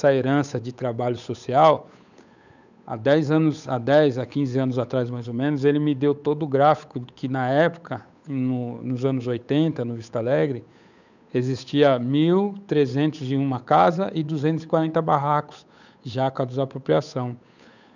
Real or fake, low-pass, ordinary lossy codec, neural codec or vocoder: fake; 7.2 kHz; none; codec, 16 kHz, 8 kbps, FunCodec, trained on Chinese and English, 25 frames a second